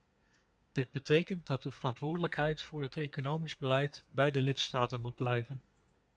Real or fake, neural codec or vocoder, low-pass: fake; codec, 24 kHz, 1 kbps, SNAC; 9.9 kHz